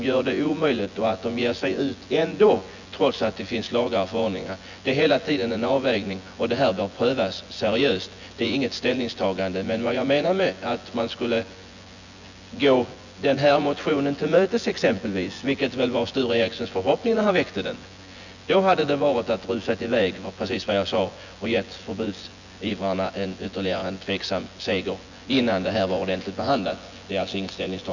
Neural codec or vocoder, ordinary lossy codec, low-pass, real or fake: vocoder, 24 kHz, 100 mel bands, Vocos; none; 7.2 kHz; fake